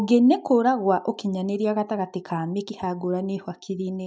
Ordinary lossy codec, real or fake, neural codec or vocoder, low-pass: none; real; none; none